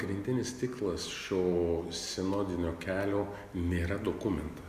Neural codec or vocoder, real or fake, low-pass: none; real; 14.4 kHz